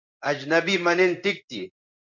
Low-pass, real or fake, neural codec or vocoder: 7.2 kHz; fake; codec, 16 kHz in and 24 kHz out, 1 kbps, XY-Tokenizer